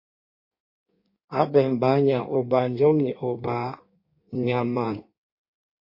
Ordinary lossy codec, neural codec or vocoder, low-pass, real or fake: MP3, 32 kbps; codec, 16 kHz in and 24 kHz out, 1.1 kbps, FireRedTTS-2 codec; 5.4 kHz; fake